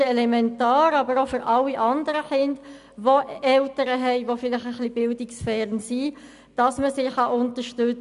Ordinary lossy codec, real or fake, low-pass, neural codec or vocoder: none; real; 10.8 kHz; none